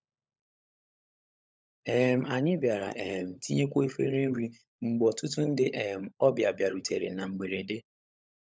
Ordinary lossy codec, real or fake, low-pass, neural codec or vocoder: none; fake; none; codec, 16 kHz, 16 kbps, FunCodec, trained on LibriTTS, 50 frames a second